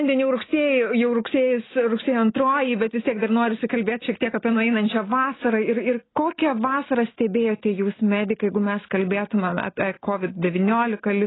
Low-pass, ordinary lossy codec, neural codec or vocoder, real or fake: 7.2 kHz; AAC, 16 kbps; none; real